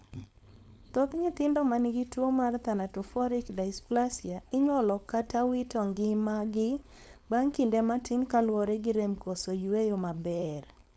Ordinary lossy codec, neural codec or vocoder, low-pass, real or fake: none; codec, 16 kHz, 4.8 kbps, FACodec; none; fake